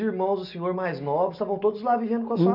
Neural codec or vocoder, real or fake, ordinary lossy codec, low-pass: none; real; none; 5.4 kHz